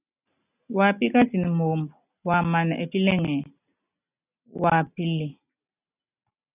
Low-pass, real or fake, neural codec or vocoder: 3.6 kHz; real; none